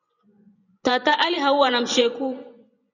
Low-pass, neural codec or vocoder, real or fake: 7.2 kHz; vocoder, 24 kHz, 100 mel bands, Vocos; fake